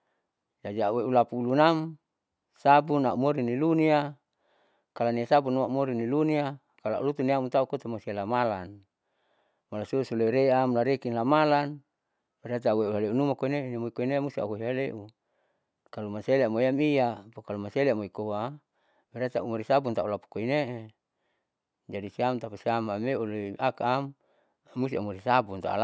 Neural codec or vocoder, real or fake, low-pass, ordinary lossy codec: none; real; none; none